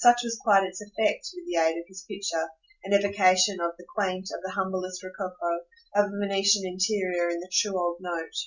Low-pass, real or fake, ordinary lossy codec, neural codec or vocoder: 7.2 kHz; real; Opus, 64 kbps; none